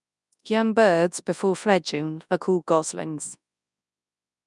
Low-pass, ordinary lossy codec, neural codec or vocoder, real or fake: 10.8 kHz; none; codec, 24 kHz, 0.9 kbps, WavTokenizer, large speech release; fake